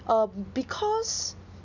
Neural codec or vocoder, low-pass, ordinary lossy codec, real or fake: none; 7.2 kHz; none; real